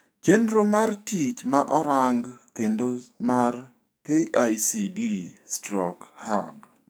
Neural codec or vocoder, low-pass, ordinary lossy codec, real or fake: codec, 44.1 kHz, 2.6 kbps, SNAC; none; none; fake